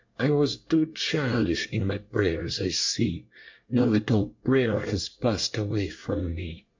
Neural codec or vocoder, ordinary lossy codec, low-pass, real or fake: codec, 24 kHz, 1 kbps, SNAC; MP3, 48 kbps; 7.2 kHz; fake